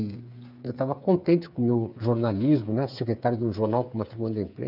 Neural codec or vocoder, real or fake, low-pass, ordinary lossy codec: codec, 16 kHz, 8 kbps, FreqCodec, smaller model; fake; 5.4 kHz; none